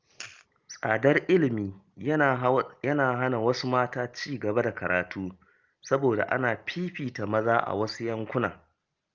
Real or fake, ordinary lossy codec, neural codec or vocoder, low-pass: real; Opus, 32 kbps; none; 7.2 kHz